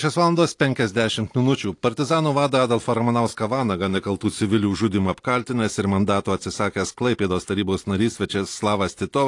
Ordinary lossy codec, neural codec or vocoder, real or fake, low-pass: AAC, 48 kbps; none; real; 10.8 kHz